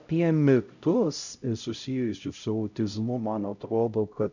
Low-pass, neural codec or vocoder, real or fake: 7.2 kHz; codec, 16 kHz, 0.5 kbps, X-Codec, HuBERT features, trained on LibriSpeech; fake